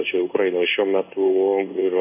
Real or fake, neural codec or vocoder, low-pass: fake; codec, 16 kHz in and 24 kHz out, 1 kbps, XY-Tokenizer; 3.6 kHz